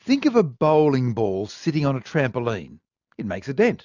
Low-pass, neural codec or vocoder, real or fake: 7.2 kHz; none; real